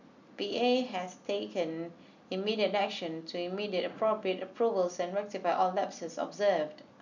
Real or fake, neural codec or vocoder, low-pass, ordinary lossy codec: real; none; 7.2 kHz; none